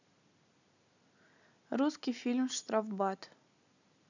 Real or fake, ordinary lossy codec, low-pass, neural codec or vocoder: real; none; 7.2 kHz; none